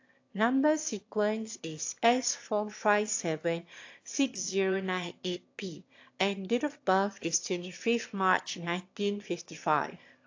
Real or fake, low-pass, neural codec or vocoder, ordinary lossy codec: fake; 7.2 kHz; autoencoder, 22.05 kHz, a latent of 192 numbers a frame, VITS, trained on one speaker; AAC, 48 kbps